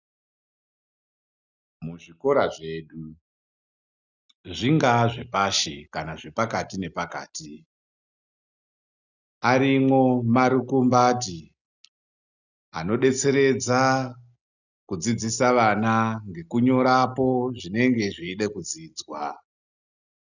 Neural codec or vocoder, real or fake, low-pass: none; real; 7.2 kHz